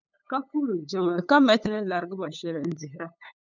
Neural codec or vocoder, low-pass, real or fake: codec, 16 kHz, 8 kbps, FunCodec, trained on LibriTTS, 25 frames a second; 7.2 kHz; fake